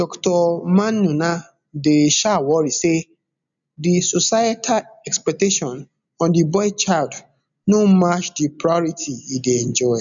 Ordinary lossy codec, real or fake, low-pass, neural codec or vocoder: none; real; 7.2 kHz; none